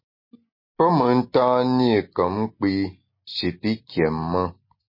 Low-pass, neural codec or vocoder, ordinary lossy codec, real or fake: 5.4 kHz; none; MP3, 24 kbps; real